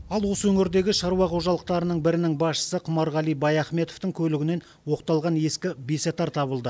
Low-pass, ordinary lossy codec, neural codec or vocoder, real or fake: none; none; none; real